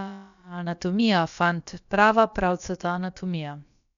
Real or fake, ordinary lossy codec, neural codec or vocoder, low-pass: fake; none; codec, 16 kHz, about 1 kbps, DyCAST, with the encoder's durations; 7.2 kHz